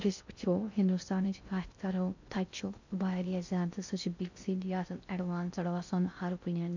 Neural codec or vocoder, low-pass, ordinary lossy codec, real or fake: codec, 16 kHz in and 24 kHz out, 0.6 kbps, FocalCodec, streaming, 2048 codes; 7.2 kHz; none; fake